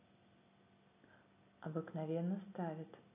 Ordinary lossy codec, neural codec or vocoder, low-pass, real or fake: none; none; 3.6 kHz; real